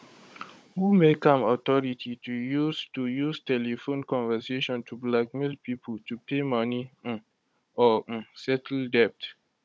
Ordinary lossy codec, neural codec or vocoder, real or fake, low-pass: none; codec, 16 kHz, 16 kbps, FunCodec, trained on Chinese and English, 50 frames a second; fake; none